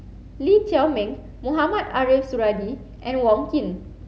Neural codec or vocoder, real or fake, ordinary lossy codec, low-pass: none; real; none; none